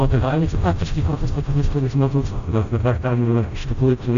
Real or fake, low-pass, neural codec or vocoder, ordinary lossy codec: fake; 7.2 kHz; codec, 16 kHz, 0.5 kbps, FreqCodec, smaller model; MP3, 64 kbps